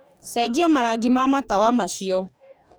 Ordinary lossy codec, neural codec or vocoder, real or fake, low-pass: none; codec, 44.1 kHz, 2.6 kbps, DAC; fake; none